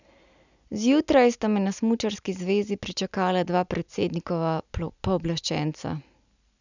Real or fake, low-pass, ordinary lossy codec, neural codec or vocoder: real; 7.2 kHz; none; none